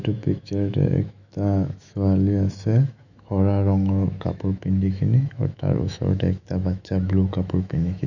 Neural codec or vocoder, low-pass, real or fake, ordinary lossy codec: autoencoder, 48 kHz, 128 numbers a frame, DAC-VAE, trained on Japanese speech; 7.2 kHz; fake; none